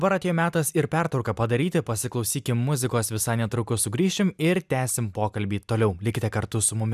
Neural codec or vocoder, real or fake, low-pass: none; real; 14.4 kHz